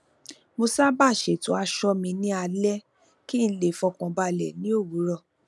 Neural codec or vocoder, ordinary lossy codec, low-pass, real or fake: none; none; none; real